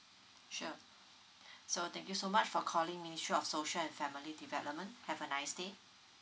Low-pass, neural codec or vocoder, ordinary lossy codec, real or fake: none; none; none; real